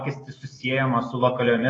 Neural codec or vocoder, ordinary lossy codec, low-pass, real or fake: none; AAC, 32 kbps; 9.9 kHz; real